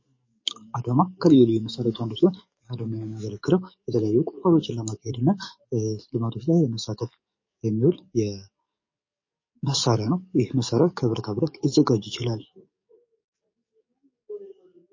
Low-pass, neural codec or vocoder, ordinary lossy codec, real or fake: 7.2 kHz; codec, 44.1 kHz, 7.8 kbps, DAC; MP3, 32 kbps; fake